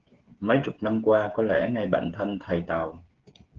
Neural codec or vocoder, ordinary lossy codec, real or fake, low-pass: codec, 16 kHz, 8 kbps, FreqCodec, smaller model; Opus, 16 kbps; fake; 7.2 kHz